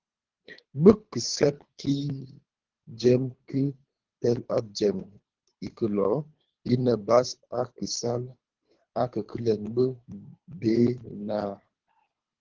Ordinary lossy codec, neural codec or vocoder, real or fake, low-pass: Opus, 16 kbps; codec, 24 kHz, 3 kbps, HILCodec; fake; 7.2 kHz